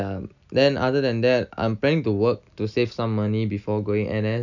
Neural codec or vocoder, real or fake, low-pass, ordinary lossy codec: none; real; 7.2 kHz; none